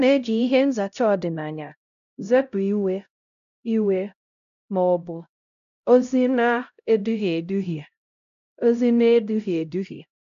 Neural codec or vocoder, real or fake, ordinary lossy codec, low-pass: codec, 16 kHz, 0.5 kbps, X-Codec, HuBERT features, trained on LibriSpeech; fake; none; 7.2 kHz